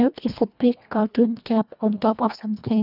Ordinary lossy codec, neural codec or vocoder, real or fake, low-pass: none; codec, 24 kHz, 1.5 kbps, HILCodec; fake; 5.4 kHz